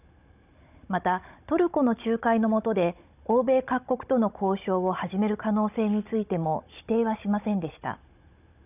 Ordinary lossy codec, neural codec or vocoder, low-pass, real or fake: none; codec, 16 kHz, 16 kbps, FunCodec, trained on Chinese and English, 50 frames a second; 3.6 kHz; fake